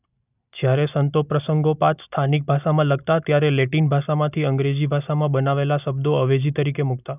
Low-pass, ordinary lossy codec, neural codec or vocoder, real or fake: 3.6 kHz; none; none; real